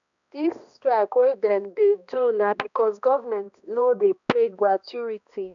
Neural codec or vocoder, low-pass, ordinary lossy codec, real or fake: codec, 16 kHz, 1 kbps, X-Codec, HuBERT features, trained on balanced general audio; 7.2 kHz; none; fake